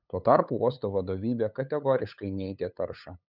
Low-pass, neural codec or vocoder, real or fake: 5.4 kHz; codec, 16 kHz, 8 kbps, FunCodec, trained on LibriTTS, 25 frames a second; fake